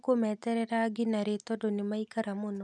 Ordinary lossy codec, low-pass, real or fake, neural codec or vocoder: none; none; real; none